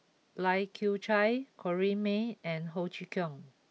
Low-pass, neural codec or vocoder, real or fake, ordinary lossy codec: none; none; real; none